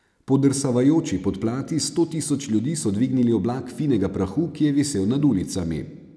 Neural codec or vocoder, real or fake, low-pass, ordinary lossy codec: none; real; none; none